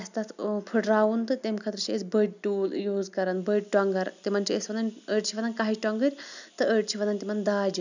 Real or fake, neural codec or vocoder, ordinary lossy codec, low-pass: real; none; none; 7.2 kHz